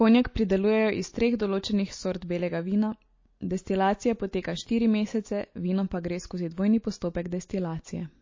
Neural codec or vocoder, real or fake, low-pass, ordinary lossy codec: none; real; 7.2 kHz; MP3, 32 kbps